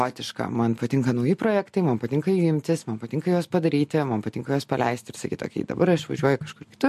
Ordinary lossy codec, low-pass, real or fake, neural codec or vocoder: MP3, 64 kbps; 14.4 kHz; real; none